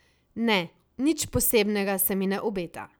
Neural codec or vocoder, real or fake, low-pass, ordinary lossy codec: none; real; none; none